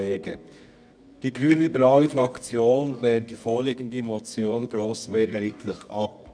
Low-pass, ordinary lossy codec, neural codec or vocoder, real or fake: 9.9 kHz; none; codec, 24 kHz, 0.9 kbps, WavTokenizer, medium music audio release; fake